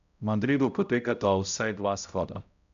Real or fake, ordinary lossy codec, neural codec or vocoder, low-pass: fake; none; codec, 16 kHz, 0.5 kbps, X-Codec, HuBERT features, trained on balanced general audio; 7.2 kHz